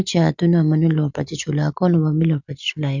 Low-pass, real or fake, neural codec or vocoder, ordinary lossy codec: 7.2 kHz; real; none; none